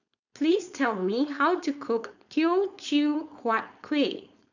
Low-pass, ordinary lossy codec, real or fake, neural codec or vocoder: 7.2 kHz; none; fake; codec, 16 kHz, 4.8 kbps, FACodec